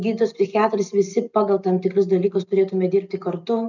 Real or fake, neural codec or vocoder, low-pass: real; none; 7.2 kHz